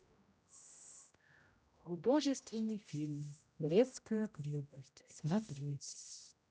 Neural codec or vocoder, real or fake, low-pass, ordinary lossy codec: codec, 16 kHz, 0.5 kbps, X-Codec, HuBERT features, trained on general audio; fake; none; none